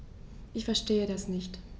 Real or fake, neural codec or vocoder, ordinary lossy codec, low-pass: real; none; none; none